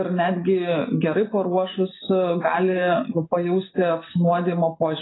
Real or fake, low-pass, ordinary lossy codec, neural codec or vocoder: real; 7.2 kHz; AAC, 16 kbps; none